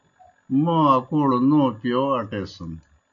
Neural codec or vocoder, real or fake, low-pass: none; real; 7.2 kHz